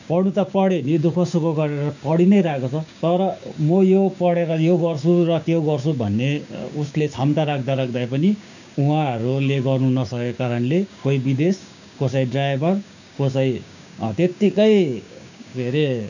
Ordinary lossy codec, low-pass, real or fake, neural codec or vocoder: none; 7.2 kHz; fake; codec, 16 kHz, 6 kbps, DAC